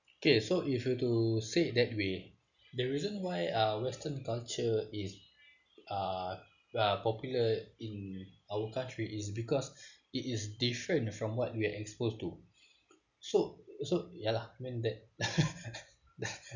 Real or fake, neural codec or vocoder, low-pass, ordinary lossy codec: real; none; 7.2 kHz; none